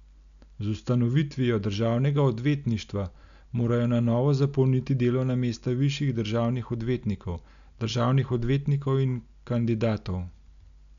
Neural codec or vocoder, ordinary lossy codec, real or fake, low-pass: none; none; real; 7.2 kHz